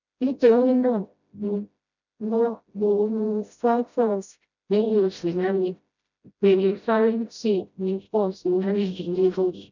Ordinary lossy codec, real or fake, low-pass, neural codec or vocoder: none; fake; 7.2 kHz; codec, 16 kHz, 0.5 kbps, FreqCodec, smaller model